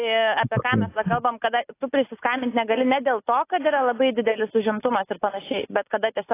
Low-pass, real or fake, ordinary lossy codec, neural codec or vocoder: 3.6 kHz; real; AAC, 24 kbps; none